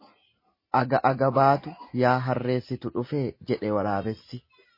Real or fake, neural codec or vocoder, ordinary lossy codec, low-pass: real; none; MP3, 24 kbps; 5.4 kHz